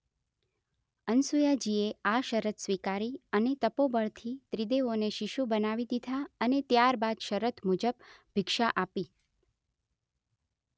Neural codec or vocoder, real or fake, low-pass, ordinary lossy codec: none; real; none; none